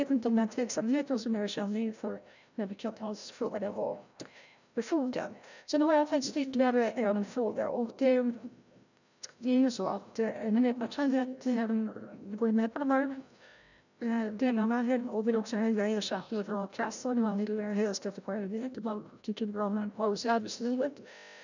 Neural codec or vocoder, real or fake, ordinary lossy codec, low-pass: codec, 16 kHz, 0.5 kbps, FreqCodec, larger model; fake; none; 7.2 kHz